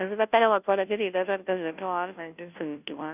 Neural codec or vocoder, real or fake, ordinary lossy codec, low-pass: codec, 16 kHz, 0.5 kbps, FunCodec, trained on Chinese and English, 25 frames a second; fake; none; 3.6 kHz